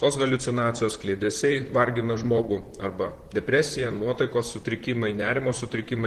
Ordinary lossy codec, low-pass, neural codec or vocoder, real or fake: Opus, 16 kbps; 14.4 kHz; vocoder, 44.1 kHz, 128 mel bands, Pupu-Vocoder; fake